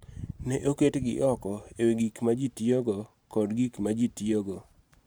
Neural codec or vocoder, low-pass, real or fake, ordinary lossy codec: vocoder, 44.1 kHz, 128 mel bands every 512 samples, BigVGAN v2; none; fake; none